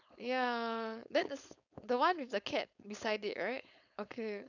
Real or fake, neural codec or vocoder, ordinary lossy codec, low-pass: fake; codec, 16 kHz, 4.8 kbps, FACodec; none; 7.2 kHz